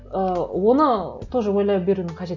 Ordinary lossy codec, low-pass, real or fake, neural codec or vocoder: none; 7.2 kHz; real; none